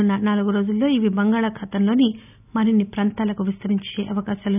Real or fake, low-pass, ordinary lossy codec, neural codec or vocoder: real; 3.6 kHz; none; none